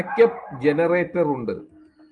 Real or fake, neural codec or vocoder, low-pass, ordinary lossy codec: real; none; 9.9 kHz; Opus, 32 kbps